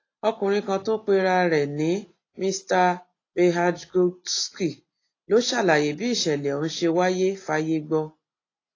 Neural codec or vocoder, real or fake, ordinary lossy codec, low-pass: none; real; AAC, 32 kbps; 7.2 kHz